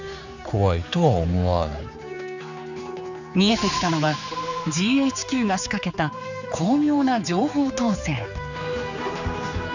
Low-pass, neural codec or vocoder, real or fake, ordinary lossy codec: 7.2 kHz; codec, 16 kHz, 4 kbps, X-Codec, HuBERT features, trained on general audio; fake; none